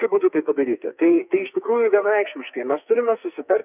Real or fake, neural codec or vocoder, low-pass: fake; codec, 44.1 kHz, 2.6 kbps, SNAC; 3.6 kHz